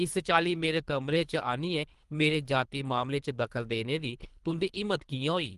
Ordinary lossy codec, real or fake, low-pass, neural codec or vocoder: Opus, 24 kbps; fake; 10.8 kHz; codec, 24 kHz, 3 kbps, HILCodec